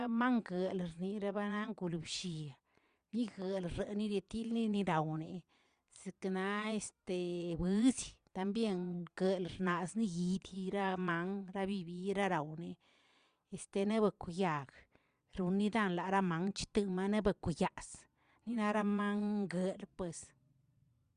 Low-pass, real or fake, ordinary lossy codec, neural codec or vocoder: 9.9 kHz; fake; Opus, 64 kbps; vocoder, 22.05 kHz, 80 mel bands, Vocos